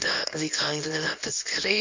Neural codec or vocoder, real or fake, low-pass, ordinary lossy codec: codec, 24 kHz, 0.9 kbps, WavTokenizer, small release; fake; 7.2 kHz; MP3, 48 kbps